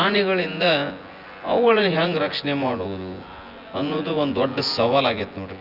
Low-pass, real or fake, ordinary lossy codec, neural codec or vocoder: 5.4 kHz; fake; Opus, 64 kbps; vocoder, 24 kHz, 100 mel bands, Vocos